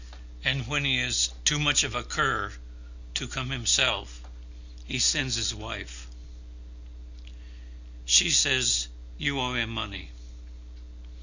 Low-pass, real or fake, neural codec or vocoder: 7.2 kHz; real; none